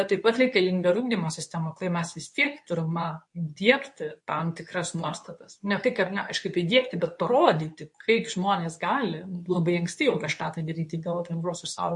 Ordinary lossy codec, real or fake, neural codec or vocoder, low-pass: MP3, 48 kbps; fake; codec, 24 kHz, 0.9 kbps, WavTokenizer, medium speech release version 2; 10.8 kHz